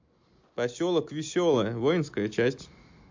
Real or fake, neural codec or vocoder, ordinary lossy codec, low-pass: real; none; MP3, 48 kbps; 7.2 kHz